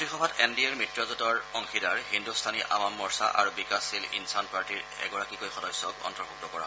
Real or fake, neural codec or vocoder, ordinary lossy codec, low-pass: real; none; none; none